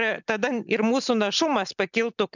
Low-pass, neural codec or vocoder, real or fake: 7.2 kHz; none; real